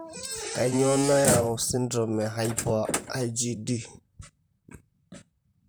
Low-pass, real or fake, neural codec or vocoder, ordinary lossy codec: none; fake; vocoder, 44.1 kHz, 128 mel bands, Pupu-Vocoder; none